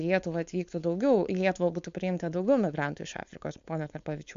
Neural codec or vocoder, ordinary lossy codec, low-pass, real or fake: codec, 16 kHz, 4.8 kbps, FACodec; MP3, 64 kbps; 7.2 kHz; fake